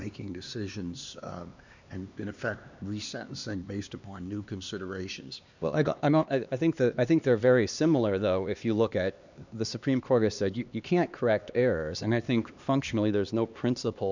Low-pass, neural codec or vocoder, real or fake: 7.2 kHz; codec, 16 kHz, 2 kbps, X-Codec, HuBERT features, trained on LibriSpeech; fake